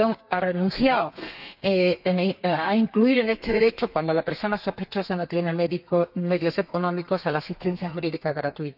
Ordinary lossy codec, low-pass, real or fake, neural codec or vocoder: none; 5.4 kHz; fake; codec, 32 kHz, 1.9 kbps, SNAC